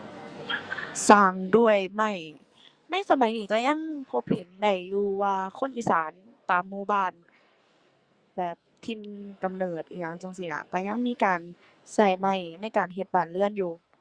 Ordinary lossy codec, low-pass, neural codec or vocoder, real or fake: Opus, 64 kbps; 9.9 kHz; codec, 44.1 kHz, 2.6 kbps, SNAC; fake